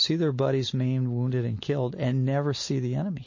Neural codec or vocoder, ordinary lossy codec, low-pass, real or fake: none; MP3, 32 kbps; 7.2 kHz; real